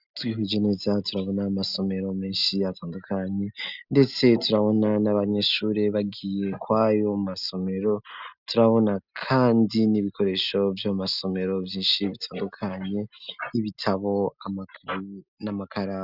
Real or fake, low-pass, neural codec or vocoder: real; 5.4 kHz; none